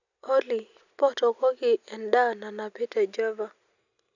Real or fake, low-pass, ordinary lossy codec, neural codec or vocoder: real; 7.2 kHz; none; none